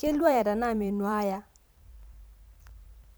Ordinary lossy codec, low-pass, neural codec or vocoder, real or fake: none; none; vocoder, 44.1 kHz, 128 mel bands every 512 samples, BigVGAN v2; fake